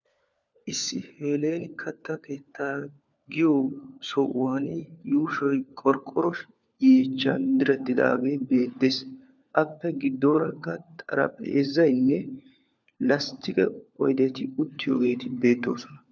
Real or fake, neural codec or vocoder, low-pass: fake; codec, 16 kHz, 4 kbps, FunCodec, trained on LibriTTS, 50 frames a second; 7.2 kHz